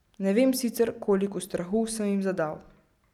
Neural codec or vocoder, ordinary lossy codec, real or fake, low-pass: none; none; real; 19.8 kHz